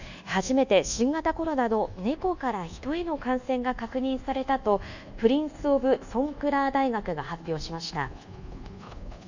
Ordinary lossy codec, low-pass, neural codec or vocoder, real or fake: none; 7.2 kHz; codec, 24 kHz, 1.2 kbps, DualCodec; fake